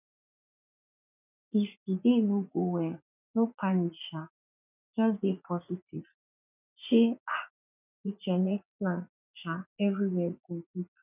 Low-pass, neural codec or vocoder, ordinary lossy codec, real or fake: 3.6 kHz; vocoder, 44.1 kHz, 80 mel bands, Vocos; none; fake